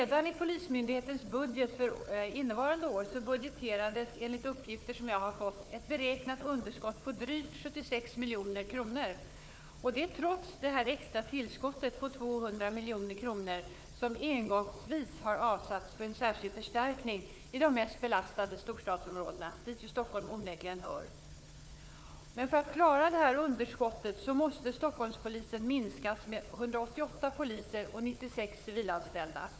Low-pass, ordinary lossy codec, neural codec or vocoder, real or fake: none; none; codec, 16 kHz, 4 kbps, FunCodec, trained on Chinese and English, 50 frames a second; fake